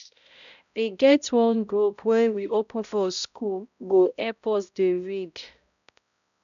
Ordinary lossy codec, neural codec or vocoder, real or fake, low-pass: none; codec, 16 kHz, 0.5 kbps, X-Codec, HuBERT features, trained on balanced general audio; fake; 7.2 kHz